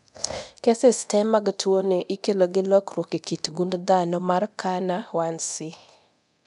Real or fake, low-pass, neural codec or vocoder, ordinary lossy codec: fake; 10.8 kHz; codec, 24 kHz, 0.9 kbps, DualCodec; none